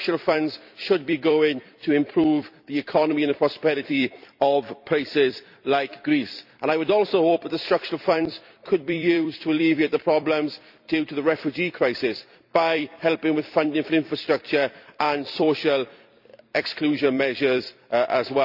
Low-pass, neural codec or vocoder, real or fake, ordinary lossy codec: 5.4 kHz; vocoder, 44.1 kHz, 128 mel bands every 256 samples, BigVGAN v2; fake; none